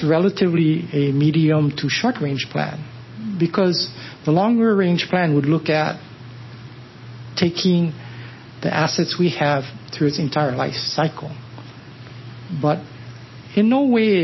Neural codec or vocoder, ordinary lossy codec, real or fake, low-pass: none; MP3, 24 kbps; real; 7.2 kHz